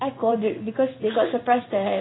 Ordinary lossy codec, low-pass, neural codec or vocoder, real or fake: AAC, 16 kbps; 7.2 kHz; codec, 16 kHz in and 24 kHz out, 1 kbps, XY-Tokenizer; fake